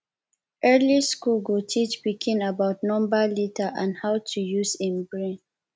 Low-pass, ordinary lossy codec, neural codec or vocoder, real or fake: none; none; none; real